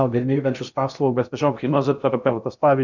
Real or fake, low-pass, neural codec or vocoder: fake; 7.2 kHz; codec, 16 kHz in and 24 kHz out, 0.6 kbps, FocalCodec, streaming, 2048 codes